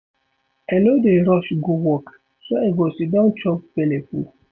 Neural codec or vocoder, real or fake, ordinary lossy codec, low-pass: none; real; none; none